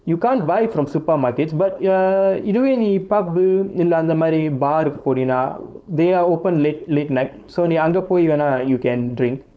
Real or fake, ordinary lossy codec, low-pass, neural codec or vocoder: fake; none; none; codec, 16 kHz, 4.8 kbps, FACodec